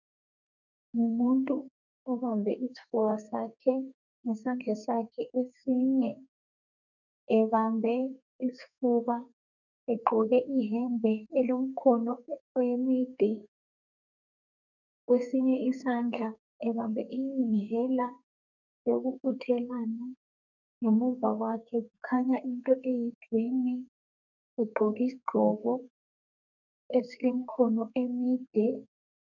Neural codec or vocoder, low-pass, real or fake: codec, 32 kHz, 1.9 kbps, SNAC; 7.2 kHz; fake